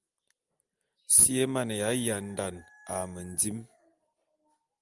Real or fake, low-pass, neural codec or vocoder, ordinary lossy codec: real; 10.8 kHz; none; Opus, 32 kbps